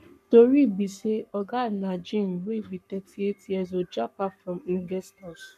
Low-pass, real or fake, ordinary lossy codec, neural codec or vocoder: 14.4 kHz; fake; none; codec, 44.1 kHz, 7.8 kbps, Pupu-Codec